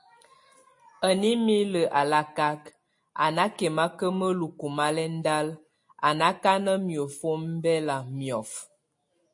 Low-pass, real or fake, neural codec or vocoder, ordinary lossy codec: 10.8 kHz; real; none; MP3, 48 kbps